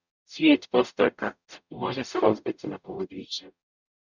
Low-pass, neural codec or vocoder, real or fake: 7.2 kHz; codec, 44.1 kHz, 0.9 kbps, DAC; fake